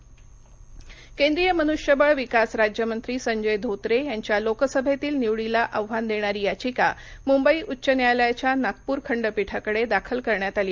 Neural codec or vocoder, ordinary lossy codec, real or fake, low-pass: none; Opus, 24 kbps; real; 7.2 kHz